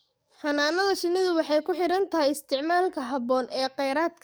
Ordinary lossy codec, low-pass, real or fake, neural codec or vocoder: none; none; fake; codec, 44.1 kHz, 7.8 kbps, DAC